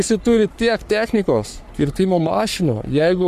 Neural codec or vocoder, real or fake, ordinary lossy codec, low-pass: codec, 44.1 kHz, 3.4 kbps, Pupu-Codec; fake; AAC, 96 kbps; 14.4 kHz